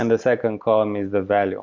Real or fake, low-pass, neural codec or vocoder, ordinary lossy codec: real; 7.2 kHz; none; MP3, 64 kbps